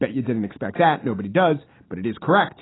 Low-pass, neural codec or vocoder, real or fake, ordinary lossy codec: 7.2 kHz; none; real; AAC, 16 kbps